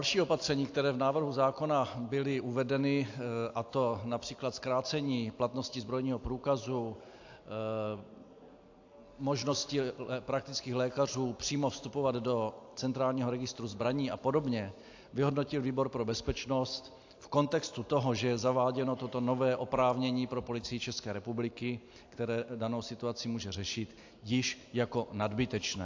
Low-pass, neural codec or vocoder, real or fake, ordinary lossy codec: 7.2 kHz; none; real; AAC, 48 kbps